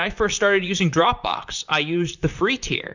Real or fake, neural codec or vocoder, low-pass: fake; vocoder, 44.1 kHz, 128 mel bands, Pupu-Vocoder; 7.2 kHz